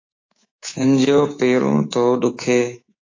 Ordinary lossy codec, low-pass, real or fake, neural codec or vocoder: AAC, 32 kbps; 7.2 kHz; fake; codec, 24 kHz, 3.1 kbps, DualCodec